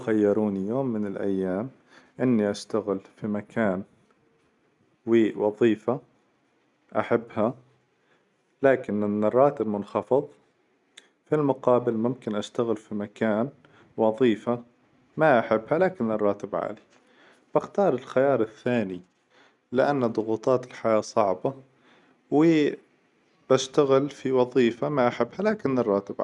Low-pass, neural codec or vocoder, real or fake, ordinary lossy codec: 10.8 kHz; none; real; none